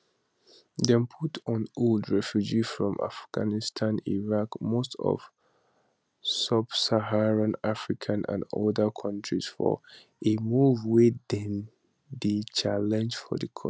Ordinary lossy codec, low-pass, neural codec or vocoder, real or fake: none; none; none; real